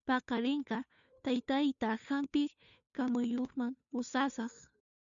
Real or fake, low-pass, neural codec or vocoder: fake; 7.2 kHz; codec, 16 kHz, 2 kbps, FunCodec, trained on Chinese and English, 25 frames a second